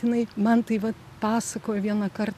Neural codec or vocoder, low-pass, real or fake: none; 14.4 kHz; real